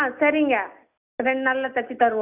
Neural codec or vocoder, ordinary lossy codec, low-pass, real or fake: none; none; 3.6 kHz; real